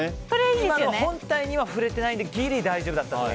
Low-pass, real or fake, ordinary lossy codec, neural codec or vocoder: none; real; none; none